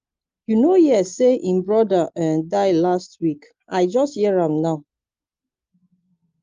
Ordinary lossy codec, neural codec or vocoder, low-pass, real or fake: Opus, 32 kbps; none; 7.2 kHz; real